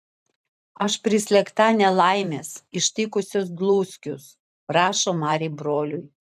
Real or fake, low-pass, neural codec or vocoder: fake; 14.4 kHz; vocoder, 44.1 kHz, 128 mel bands every 512 samples, BigVGAN v2